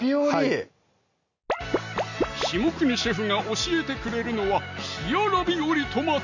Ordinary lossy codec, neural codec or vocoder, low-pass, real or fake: none; none; 7.2 kHz; real